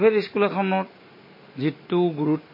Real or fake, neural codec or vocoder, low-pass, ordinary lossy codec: real; none; 5.4 kHz; MP3, 24 kbps